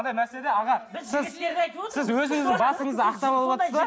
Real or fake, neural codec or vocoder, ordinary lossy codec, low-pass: real; none; none; none